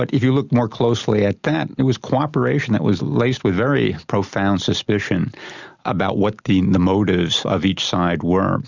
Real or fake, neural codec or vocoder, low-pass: real; none; 7.2 kHz